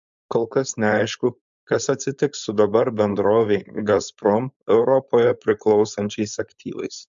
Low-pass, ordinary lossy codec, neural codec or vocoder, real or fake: 7.2 kHz; MP3, 64 kbps; codec, 16 kHz, 4.8 kbps, FACodec; fake